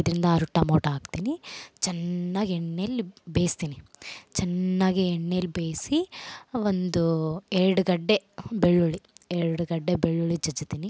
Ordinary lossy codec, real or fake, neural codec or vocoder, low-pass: none; real; none; none